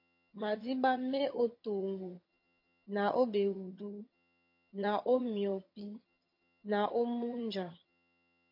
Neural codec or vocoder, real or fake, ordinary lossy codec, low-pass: vocoder, 22.05 kHz, 80 mel bands, HiFi-GAN; fake; MP3, 32 kbps; 5.4 kHz